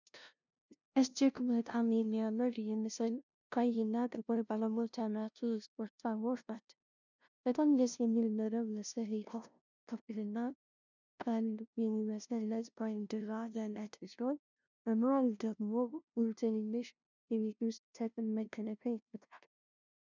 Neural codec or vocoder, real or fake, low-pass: codec, 16 kHz, 0.5 kbps, FunCodec, trained on LibriTTS, 25 frames a second; fake; 7.2 kHz